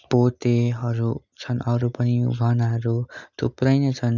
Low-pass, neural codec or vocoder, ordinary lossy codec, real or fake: 7.2 kHz; none; none; real